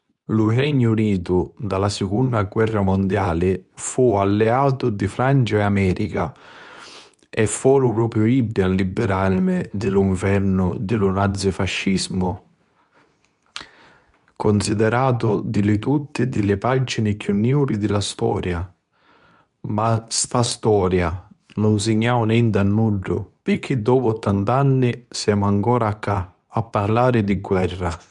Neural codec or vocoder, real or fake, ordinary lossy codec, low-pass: codec, 24 kHz, 0.9 kbps, WavTokenizer, medium speech release version 2; fake; none; 10.8 kHz